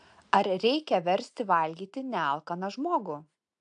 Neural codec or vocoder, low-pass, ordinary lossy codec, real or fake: none; 9.9 kHz; AAC, 64 kbps; real